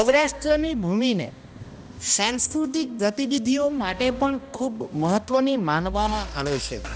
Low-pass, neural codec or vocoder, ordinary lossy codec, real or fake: none; codec, 16 kHz, 1 kbps, X-Codec, HuBERT features, trained on balanced general audio; none; fake